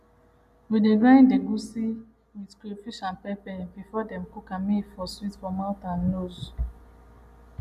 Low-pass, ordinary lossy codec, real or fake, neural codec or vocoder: 14.4 kHz; none; real; none